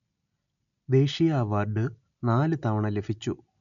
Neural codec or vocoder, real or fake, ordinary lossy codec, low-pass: none; real; none; 7.2 kHz